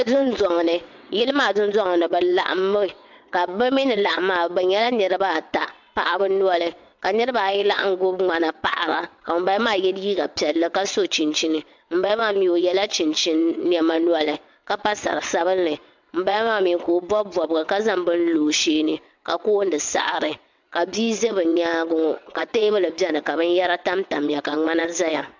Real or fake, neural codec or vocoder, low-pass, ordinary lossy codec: fake; vocoder, 22.05 kHz, 80 mel bands, WaveNeXt; 7.2 kHz; MP3, 64 kbps